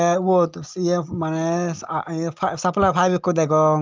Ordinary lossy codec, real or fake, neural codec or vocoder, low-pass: Opus, 24 kbps; real; none; 7.2 kHz